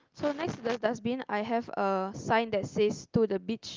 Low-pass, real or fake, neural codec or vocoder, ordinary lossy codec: 7.2 kHz; real; none; Opus, 24 kbps